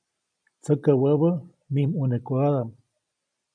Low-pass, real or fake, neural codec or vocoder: 9.9 kHz; real; none